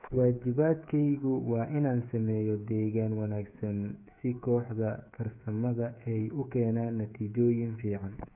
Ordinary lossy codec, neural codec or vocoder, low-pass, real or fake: none; codec, 16 kHz, 8 kbps, FreqCodec, smaller model; 3.6 kHz; fake